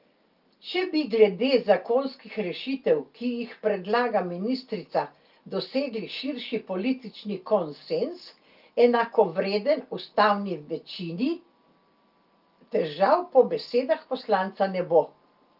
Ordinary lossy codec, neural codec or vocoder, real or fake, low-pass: Opus, 24 kbps; none; real; 5.4 kHz